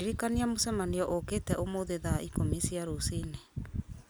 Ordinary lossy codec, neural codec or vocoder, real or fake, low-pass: none; none; real; none